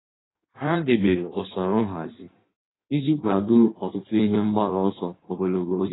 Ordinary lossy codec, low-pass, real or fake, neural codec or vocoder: AAC, 16 kbps; 7.2 kHz; fake; codec, 16 kHz in and 24 kHz out, 1.1 kbps, FireRedTTS-2 codec